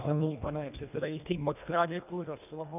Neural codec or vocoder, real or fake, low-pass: codec, 24 kHz, 1.5 kbps, HILCodec; fake; 3.6 kHz